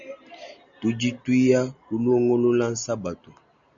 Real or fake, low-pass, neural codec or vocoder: real; 7.2 kHz; none